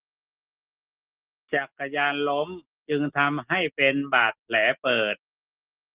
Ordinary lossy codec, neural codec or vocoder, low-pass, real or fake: Opus, 16 kbps; none; 3.6 kHz; real